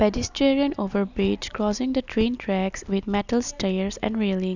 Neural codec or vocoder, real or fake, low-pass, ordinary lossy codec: none; real; 7.2 kHz; none